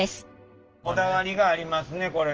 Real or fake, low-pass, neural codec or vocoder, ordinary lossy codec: real; 7.2 kHz; none; Opus, 16 kbps